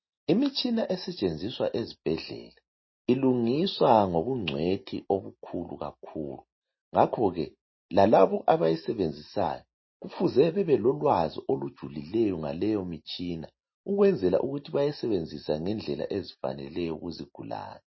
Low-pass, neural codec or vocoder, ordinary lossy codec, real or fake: 7.2 kHz; none; MP3, 24 kbps; real